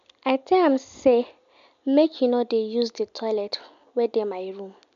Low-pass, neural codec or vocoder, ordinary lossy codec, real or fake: 7.2 kHz; none; MP3, 64 kbps; real